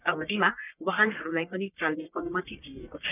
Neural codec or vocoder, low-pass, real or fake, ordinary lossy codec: codec, 44.1 kHz, 1.7 kbps, Pupu-Codec; 3.6 kHz; fake; none